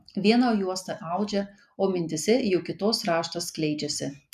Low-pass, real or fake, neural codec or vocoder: 14.4 kHz; real; none